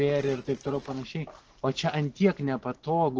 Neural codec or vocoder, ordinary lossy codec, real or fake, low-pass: none; Opus, 16 kbps; real; 7.2 kHz